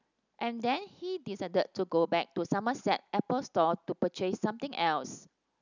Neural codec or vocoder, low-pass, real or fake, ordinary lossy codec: none; 7.2 kHz; real; none